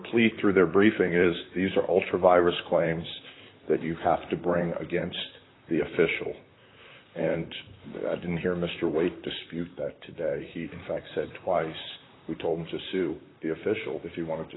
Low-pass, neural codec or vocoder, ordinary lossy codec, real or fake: 7.2 kHz; vocoder, 44.1 kHz, 128 mel bands, Pupu-Vocoder; AAC, 16 kbps; fake